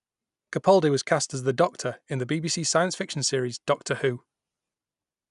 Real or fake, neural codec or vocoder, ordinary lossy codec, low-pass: real; none; none; 10.8 kHz